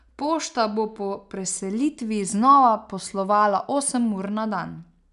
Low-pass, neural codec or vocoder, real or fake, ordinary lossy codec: 10.8 kHz; none; real; none